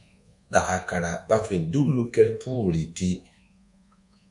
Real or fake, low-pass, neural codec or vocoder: fake; 10.8 kHz; codec, 24 kHz, 1.2 kbps, DualCodec